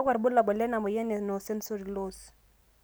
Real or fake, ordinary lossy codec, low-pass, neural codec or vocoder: real; none; none; none